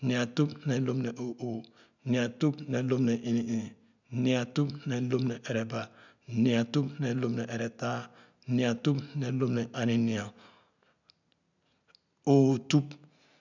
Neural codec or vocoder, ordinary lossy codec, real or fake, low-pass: none; none; real; 7.2 kHz